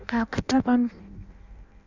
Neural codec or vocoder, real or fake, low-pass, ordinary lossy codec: codec, 16 kHz in and 24 kHz out, 0.6 kbps, FireRedTTS-2 codec; fake; 7.2 kHz; none